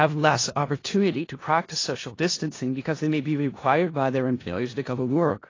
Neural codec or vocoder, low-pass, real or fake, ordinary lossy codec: codec, 16 kHz in and 24 kHz out, 0.4 kbps, LongCat-Audio-Codec, four codebook decoder; 7.2 kHz; fake; AAC, 32 kbps